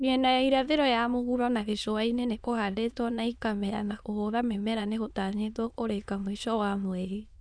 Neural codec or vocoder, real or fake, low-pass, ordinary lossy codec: autoencoder, 22.05 kHz, a latent of 192 numbers a frame, VITS, trained on many speakers; fake; 9.9 kHz; none